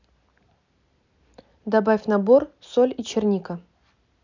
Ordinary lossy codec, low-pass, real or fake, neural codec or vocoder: none; 7.2 kHz; real; none